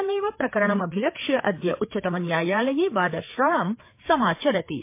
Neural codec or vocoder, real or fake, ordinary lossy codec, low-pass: codec, 16 kHz, 4 kbps, FreqCodec, larger model; fake; MP3, 24 kbps; 3.6 kHz